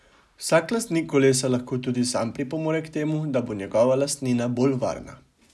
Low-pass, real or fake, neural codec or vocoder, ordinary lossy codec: none; real; none; none